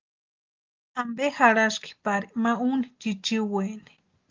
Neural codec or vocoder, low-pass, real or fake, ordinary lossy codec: none; 7.2 kHz; real; Opus, 24 kbps